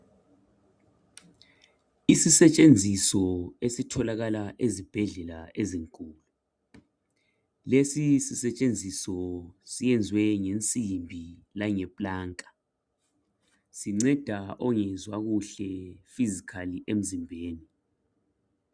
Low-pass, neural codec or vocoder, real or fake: 9.9 kHz; none; real